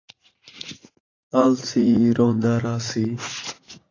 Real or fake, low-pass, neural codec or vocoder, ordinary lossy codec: fake; 7.2 kHz; vocoder, 44.1 kHz, 80 mel bands, Vocos; AAC, 32 kbps